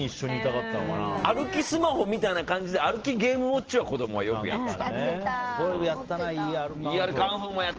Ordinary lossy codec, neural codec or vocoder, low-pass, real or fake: Opus, 16 kbps; none; 7.2 kHz; real